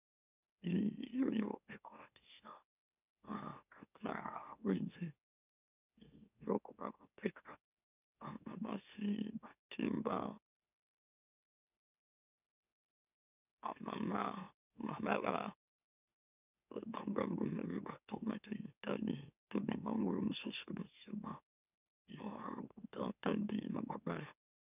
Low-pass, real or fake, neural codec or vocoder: 3.6 kHz; fake; autoencoder, 44.1 kHz, a latent of 192 numbers a frame, MeloTTS